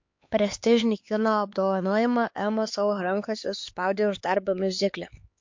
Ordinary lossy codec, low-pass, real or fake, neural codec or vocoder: MP3, 48 kbps; 7.2 kHz; fake; codec, 16 kHz, 4 kbps, X-Codec, HuBERT features, trained on LibriSpeech